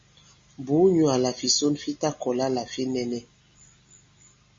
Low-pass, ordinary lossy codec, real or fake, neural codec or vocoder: 7.2 kHz; MP3, 32 kbps; real; none